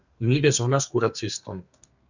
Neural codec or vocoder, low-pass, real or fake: codec, 32 kHz, 1.9 kbps, SNAC; 7.2 kHz; fake